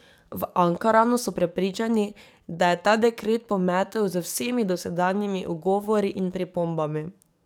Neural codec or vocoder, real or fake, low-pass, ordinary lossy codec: codec, 44.1 kHz, 7.8 kbps, DAC; fake; 19.8 kHz; none